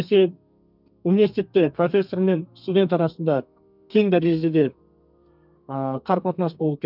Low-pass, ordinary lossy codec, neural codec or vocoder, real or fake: 5.4 kHz; none; codec, 32 kHz, 1.9 kbps, SNAC; fake